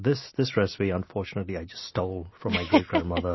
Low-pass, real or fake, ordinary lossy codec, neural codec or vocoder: 7.2 kHz; real; MP3, 24 kbps; none